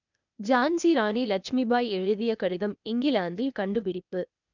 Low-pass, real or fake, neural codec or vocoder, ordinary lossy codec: 7.2 kHz; fake; codec, 16 kHz, 0.8 kbps, ZipCodec; none